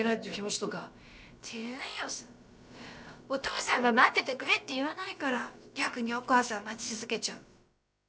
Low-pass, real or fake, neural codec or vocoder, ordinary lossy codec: none; fake; codec, 16 kHz, about 1 kbps, DyCAST, with the encoder's durations; none